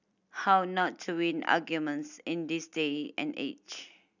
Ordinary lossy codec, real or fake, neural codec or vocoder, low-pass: none; real; none; 7.2 kHz